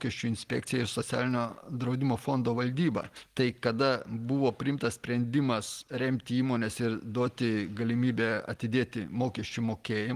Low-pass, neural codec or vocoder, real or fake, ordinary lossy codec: 14.4 kHz; none; real; Opus, 24 kbps